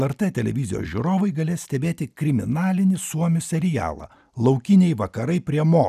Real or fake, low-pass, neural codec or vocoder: real; 14.4 kHz; none